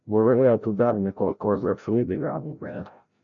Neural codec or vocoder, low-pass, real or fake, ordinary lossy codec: codec, 16 kHz, 0.5 kbps, FreqCodec, larger model; 7.2 kHz; fake; MP3, 64 kbps